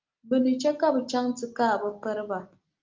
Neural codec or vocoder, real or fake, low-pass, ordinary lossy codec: none; real; 7.2 kHz; Opus, 32 kbps